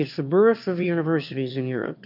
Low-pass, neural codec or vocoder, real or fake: 5.4 kHz; autoencoder, 22.05 kHz, a latent of 192 numbers a frame, VITS, trained on one speaker; fake